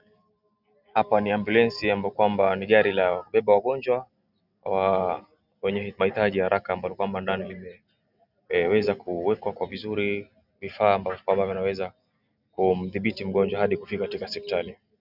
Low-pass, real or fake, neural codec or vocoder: 5.4 kHz; real; none